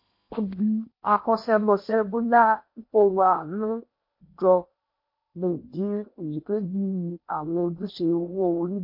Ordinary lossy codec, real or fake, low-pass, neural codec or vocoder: MP3, 32 kbps; fake; 5.4 kHz; codec, 16 kHz in and 24 kHz out, 0.8 kbps, FocalCodec, streaming, 65536 codes